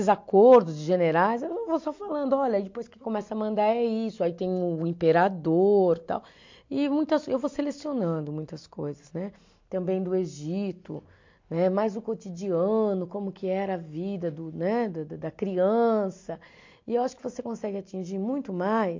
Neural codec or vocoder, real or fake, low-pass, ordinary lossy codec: none; real; 7.2 kHz; MP3, 48 kbps